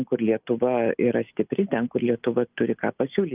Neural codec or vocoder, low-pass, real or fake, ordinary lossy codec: none; 3.6 kHz; real; Opus, 32 kbps